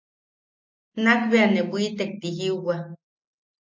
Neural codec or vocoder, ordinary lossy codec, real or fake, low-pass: none; MP3, 48 kbps; real; 7.2 kHz